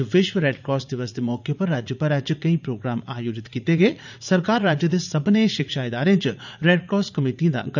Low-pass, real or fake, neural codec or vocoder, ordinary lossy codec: 7.2 kHz; fake; vocoder, 44.1 kHz, 80 mel bands, Vocos; none